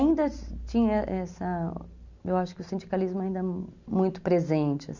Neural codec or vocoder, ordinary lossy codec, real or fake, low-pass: none; none; real; 7.2 kHz